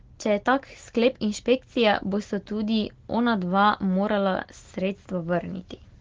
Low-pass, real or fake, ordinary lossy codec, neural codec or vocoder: 7.2 kHz; real; Opus, 16 kbps; none